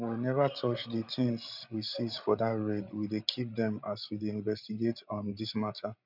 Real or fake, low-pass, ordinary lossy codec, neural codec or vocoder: fake; 5.4 kHz; none; codec, 16 kHz, 16 kbps, FreqCodec, larger model